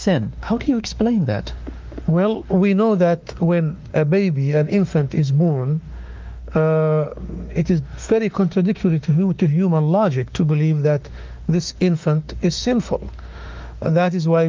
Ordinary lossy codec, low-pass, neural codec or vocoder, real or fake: Opus, 32 kbps; 7.2 kHz; autoencoder, 48 kHz, 32 numbers a frame, DAC-VAE, trained on Japanese speech; fake